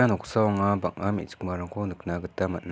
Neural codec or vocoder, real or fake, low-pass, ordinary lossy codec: none; real; none; none